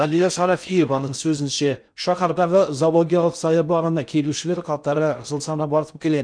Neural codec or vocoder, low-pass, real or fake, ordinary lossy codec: codec, 16 kHz in and 24 kHz out, 0.6 kbps, FocalCodec, streaming, 4096 codes; 9.9 kHz; fake; none